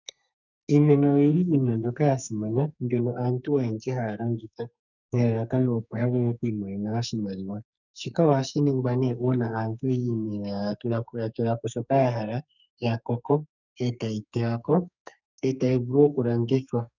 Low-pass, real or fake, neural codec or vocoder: 7.2 kHz; fake; codec, 44.1 kHz, 2.6 kbps, SNAC